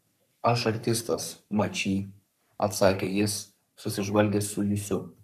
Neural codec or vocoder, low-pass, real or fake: codec, 44.1 kHz, 3.4 kbps, Pupu-Codec; 14.4 kHz; fake